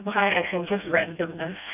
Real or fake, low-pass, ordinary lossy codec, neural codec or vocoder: fake; 3.6 kHz; none; codec, 16 kHz, 1 kbps, FreqCodec, smaller model